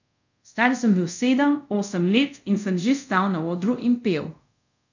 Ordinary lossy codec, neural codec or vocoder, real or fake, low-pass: none; codec, 24 kHz, 0.5 kbps, DualCodec; fake; 7.2 kHz